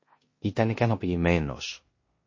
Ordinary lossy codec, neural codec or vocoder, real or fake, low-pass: MP3, 32 kbps; codec, 16 kHz in and 24 kHz out, 0.9 kbps, LongCat-Audio-Codec, four codebook decoder; fake; 7.2 kHz